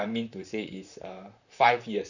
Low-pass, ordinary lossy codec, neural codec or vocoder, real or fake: 7.2 kHz; none; vocoder, 44.1 kHz, 128 mel bands, Pupu-Vocoder; fake